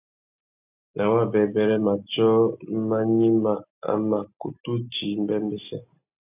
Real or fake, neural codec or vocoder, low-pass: real; none; 3.6 kHz